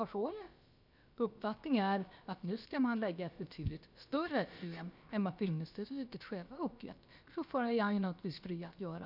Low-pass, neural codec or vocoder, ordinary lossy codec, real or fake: 5.4 kHz; codec, 16 kHz, about 1 kbps, DyCAST, with the encoder's durations; none; fake